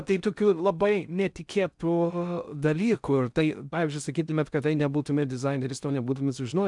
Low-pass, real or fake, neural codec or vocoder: 10.8 kHz; fake; codec, 16 kHz in and 24 kHz out, 0.6 kbps, FocalCodec, streaming, 4096 codes